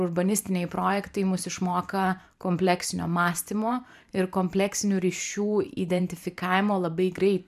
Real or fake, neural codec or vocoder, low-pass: fake; vocoder, 44.1 kHz, 128 mel bands every 512 samples, BigVGAN v2; 14.4 kHz